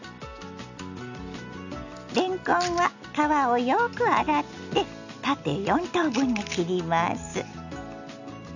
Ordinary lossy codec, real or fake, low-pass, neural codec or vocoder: none; real; 7.2 kHz; none